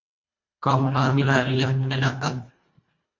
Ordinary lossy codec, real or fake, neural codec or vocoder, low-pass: MP3, 48 kbps; fake; codec, 24 kHz, 1.5 kbps, HILCodec; 7.2 kHz